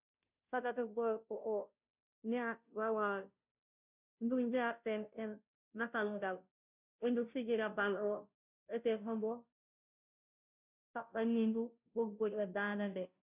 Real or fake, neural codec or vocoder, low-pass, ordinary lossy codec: fake; codec, 16 kHz, 0.5 kbps, FunCodec, trained on Chinese and English, 25 frames a second; 3.6 kHz; none